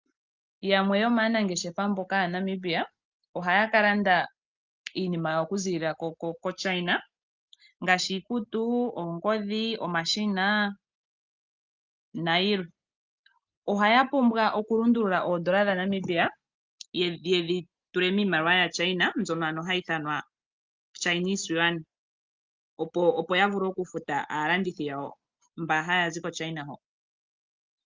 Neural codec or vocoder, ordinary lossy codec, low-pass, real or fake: none; Opus, 24 kbps; 7.2 kHz; real